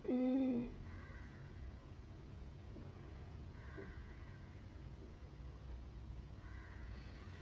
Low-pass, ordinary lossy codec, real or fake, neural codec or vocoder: none; none; fake; codec, 16 kHz, 16 kbps, FreqCodec, smaller model